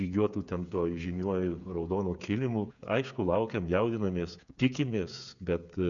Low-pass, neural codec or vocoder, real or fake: 7.2 kHz; codec, 16 kHz, 8 kbps, FreqCodec, smaller model; fake